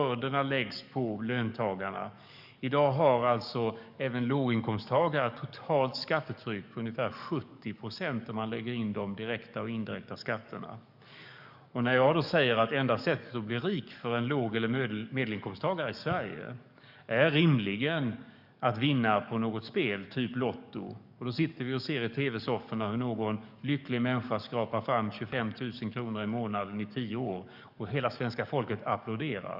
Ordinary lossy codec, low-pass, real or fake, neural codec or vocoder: none; 5.4 kHz; fake; codec, 44.1 kHz, 7.8 kbps, DAC